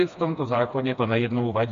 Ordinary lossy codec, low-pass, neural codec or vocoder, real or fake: MP3, 48 kbps; 7.2 kHz; codec, 16 kHz, 2 kbps, FreqCodec, smaller model; fake